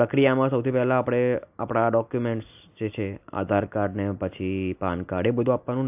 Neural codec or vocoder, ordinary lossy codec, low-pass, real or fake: none; AAC, 32 kbps; 3.6 kHz; real